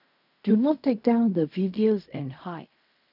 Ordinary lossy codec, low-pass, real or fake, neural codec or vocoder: none; 5.4 kHz; fake; codec, 16 kHz in and 24 kHz out, 0.4 kbps, LongCat-Audio-Codec, fine tuned four codebook decoder